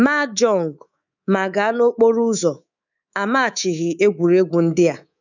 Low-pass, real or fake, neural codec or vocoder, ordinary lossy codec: 7.2 kHz; fake; codec, 24 kHz, 3.1 kbps, DualCodec; none